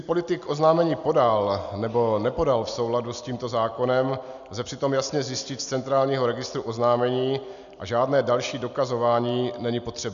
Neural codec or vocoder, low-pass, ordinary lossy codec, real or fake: none; 7.2 kHz; MP3, 96 kbps; real